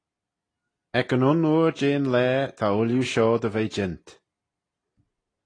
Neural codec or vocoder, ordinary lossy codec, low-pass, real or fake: none; AAC, 32 kbps; 9.9 kHz; real